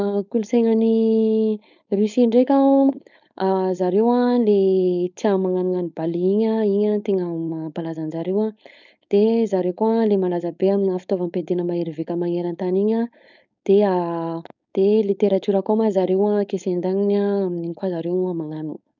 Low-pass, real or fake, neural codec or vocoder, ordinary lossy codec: 7.2 kHz; fake; codec, 16 kHz, 4.8 kbps, FACodec; none